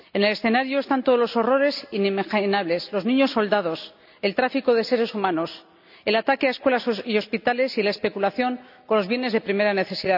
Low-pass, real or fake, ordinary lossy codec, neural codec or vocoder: 5.4 kHz; real; none; none